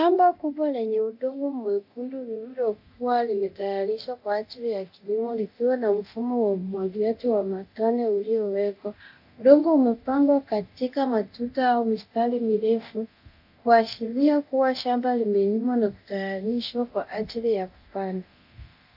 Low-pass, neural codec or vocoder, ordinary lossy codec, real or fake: 5.4 kHz; codec, 24 kHz, 0.9 kbps, DualCodec; AAC, 48 kbps; fake